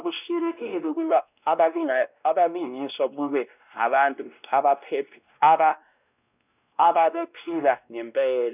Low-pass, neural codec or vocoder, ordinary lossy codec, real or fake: 3.6 kHz; codec, 16 kHz, 1 kbps, X-Codec, WavLM features, trained on Multilingual LibriSpeech; none; fake